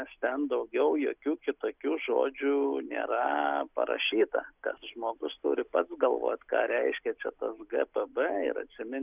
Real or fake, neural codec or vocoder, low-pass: real; none; 3.6 kHz